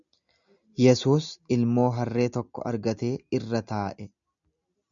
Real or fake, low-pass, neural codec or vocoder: real; 7.2 kHz; none